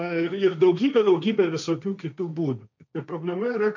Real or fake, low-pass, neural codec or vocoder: fake; 7.2 kHz; codec, 16 kHz, 1.1 kbps, Voila-Tokenizer